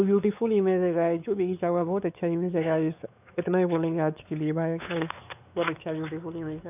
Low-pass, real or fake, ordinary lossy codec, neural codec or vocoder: 3.6 kHz; fake; none; codec, 16 kHz, 4 kbps, X-Codec, WavLM features, trained on Multilingual LibriSpeech